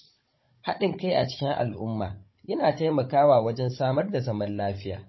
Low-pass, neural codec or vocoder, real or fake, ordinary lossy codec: 7.2 kHz; codec, 16 kHz, 16 kbps, FunCodec, trained on Chinese and English, 50 frames a second; fake; MP3, 24 kbps